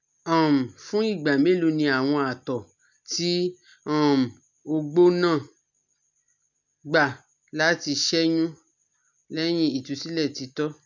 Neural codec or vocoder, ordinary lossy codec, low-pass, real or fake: none; none; 7.2 kHz; real